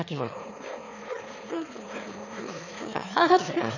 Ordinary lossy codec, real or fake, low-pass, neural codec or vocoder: none; fake; 7.2 kHz; autoencoder, 22.05 kHz, a latent of 192 numbers a frame, VITS, trained on one speaker